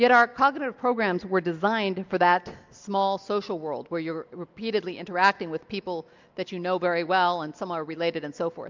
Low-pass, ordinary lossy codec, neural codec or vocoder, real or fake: 7.2 kHz; MP3, 64 kbps; none; real